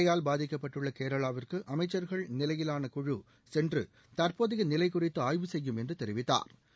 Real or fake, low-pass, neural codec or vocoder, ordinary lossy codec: real; none; none; none